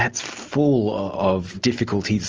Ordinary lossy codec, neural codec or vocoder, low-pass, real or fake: Opus, 24 kbps; none; 7.2 kHz; real